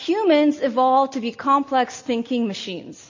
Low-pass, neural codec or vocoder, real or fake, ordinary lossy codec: 7.2 kHz; none; real; MP3, 32 kbps